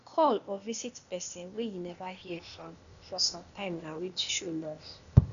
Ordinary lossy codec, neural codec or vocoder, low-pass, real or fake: none; codec, 16 kHz, 0.8 kbps, ZipCodec; 7.2 kHz; fake